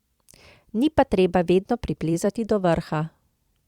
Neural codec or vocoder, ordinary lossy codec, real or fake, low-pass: vocoder, 44.1 kHz, 128 mel bands every 256 samples, BigVGAN v2; Opus, 64 kbps; fake; 19.8 kHz